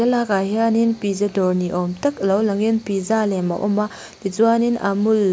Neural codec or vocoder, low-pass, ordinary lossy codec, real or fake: none; none; none; real